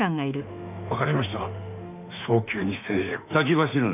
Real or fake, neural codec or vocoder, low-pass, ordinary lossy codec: fake; autoencoder, 48 kHz, 32 numbers a frame, DAC-VAE, trained on Japanese speech; 3.6 kHz; none